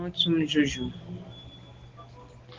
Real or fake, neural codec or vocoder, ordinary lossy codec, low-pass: real; none; Opus, 16 kbps; 7.2 kHz